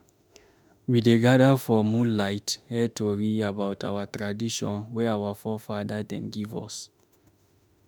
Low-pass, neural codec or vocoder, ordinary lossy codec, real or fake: none; autoencoder, 48 kHz, 32 numbers a frame, DAC-VAE, trained on Japanese speech; none; fake